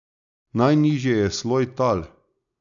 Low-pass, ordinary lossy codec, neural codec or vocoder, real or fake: 7.2 kHz; none; none; real